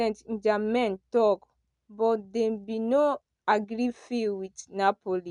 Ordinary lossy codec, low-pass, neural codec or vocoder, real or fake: none; 10.8 kHz; none; real